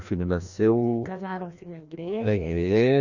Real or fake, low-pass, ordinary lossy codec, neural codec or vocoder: fake; 7.2 kHz; none; codec, 16 kHz, 1 kbps, FreqCodec, larger model